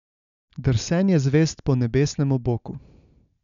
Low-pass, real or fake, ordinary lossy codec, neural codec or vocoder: 7.2 kHz; real; none; none